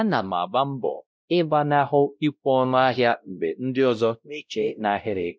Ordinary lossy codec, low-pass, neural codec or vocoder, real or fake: none; none; codec, 16 kHz, 0.5 kbps, X-Codec, WavLM features, trained on Multilingual LibriSpeech; fake